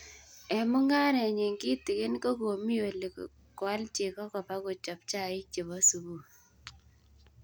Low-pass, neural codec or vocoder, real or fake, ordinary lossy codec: none; none; real; none